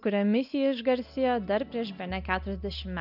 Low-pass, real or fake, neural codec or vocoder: 5.4 kHz; real; none